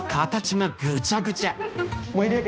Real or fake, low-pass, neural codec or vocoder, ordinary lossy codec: fake; none; codec, 16 kHz, 1 kbps, X-Codec, HuBERT features, trained on balanced general audio; none